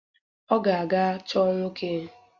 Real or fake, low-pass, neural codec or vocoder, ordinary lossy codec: real; 7.2 kHz; none; Opus, 64 kbps